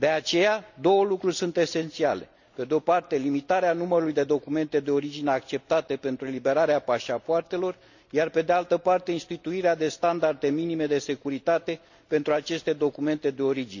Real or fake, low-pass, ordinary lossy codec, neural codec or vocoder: real; 7.2 kHz; none; none